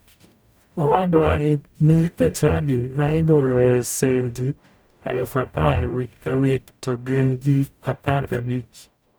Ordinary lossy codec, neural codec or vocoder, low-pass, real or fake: none; codec, 44.1 kHz, 0.9 kbps, DAC; none; fake